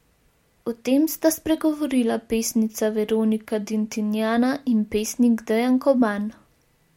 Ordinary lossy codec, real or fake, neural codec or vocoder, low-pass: MP3, 64 kbps; real; none; 19.8 kHz